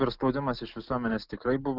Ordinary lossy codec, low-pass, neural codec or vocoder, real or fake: Opus, 24 kbps; 5.4 kHz; none; real